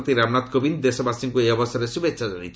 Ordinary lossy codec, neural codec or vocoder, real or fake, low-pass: none; none; real; none